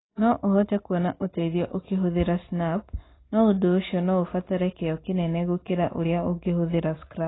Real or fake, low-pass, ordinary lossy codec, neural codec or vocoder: real; 7.2 kHz; AAC, 16 kbps; none